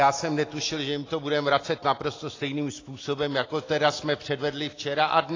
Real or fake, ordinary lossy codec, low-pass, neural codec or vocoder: real; AAC, 32 kbps; 7.2 kHz; none